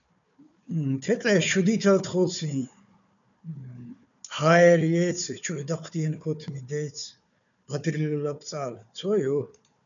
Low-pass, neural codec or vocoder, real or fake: 7.2 kHz; codec, 16 kHz, 4 kbps, FunCodec, trained on Chinese and English, 50 frames a second; fake